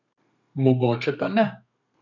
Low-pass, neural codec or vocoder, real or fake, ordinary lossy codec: 7.2 kHz; codec, 32 kHz, 1.9 kbps, SNAC; fake; none